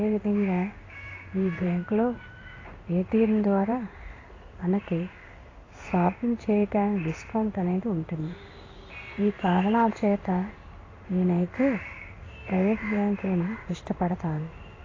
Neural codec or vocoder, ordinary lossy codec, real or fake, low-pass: codec, 16 kHz in and 24 kHz out, 1 kbps, XY-Tokenizer; AAC, 32 kbps; fake; 7.2 kHz